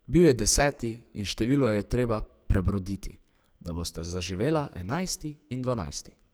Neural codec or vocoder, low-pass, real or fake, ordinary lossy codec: codec, 44.1 kHz, 2.6 kbps, SNAC; none; fake; none